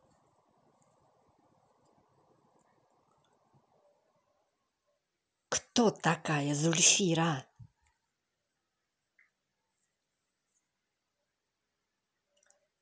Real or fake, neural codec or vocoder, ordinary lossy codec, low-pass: real; none; none; none